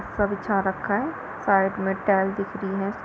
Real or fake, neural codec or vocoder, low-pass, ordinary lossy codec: real; none; none; none